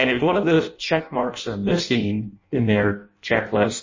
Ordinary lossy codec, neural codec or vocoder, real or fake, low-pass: MP3, 32 kbps; codec, 16 kHz in and 24 kHz out, 0.6 kbps, FireRedTTS-2 codec; fake; 7.2 kHz